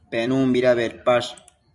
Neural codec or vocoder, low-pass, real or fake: vocoder, 44.1 kHz, 128 mel bands every 256 samples, BigVGAN v2; 10.8 kHz; fake